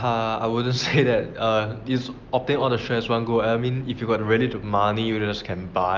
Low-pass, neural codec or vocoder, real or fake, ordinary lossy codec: 7.2 kHz; none; real; Opus, 32 kbps